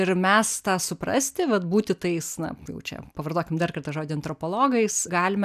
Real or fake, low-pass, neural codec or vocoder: real; 14.4 kHz; none